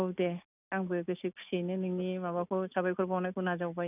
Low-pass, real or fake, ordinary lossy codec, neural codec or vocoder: 3.6 kHz; real; none; none